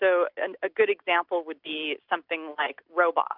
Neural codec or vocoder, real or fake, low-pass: none; real; 5.4 kHz